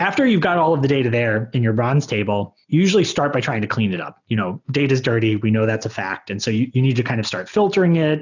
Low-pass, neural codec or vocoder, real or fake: 7.2 kHz; none; real